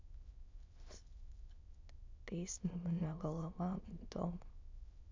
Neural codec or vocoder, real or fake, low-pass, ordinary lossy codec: autoencoder, 22.05 kHz, a latent of 192 numbers a frame, VITS, trained on many speakers; fake; 7.2 kHz; MP3, 64 kbps